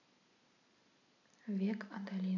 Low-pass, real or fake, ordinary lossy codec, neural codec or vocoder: 7.2 kHz; real; none; none